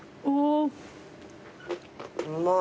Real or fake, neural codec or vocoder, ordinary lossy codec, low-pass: real; none; none; none